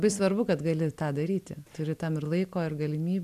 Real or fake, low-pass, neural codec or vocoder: real; 14.4 kHz; none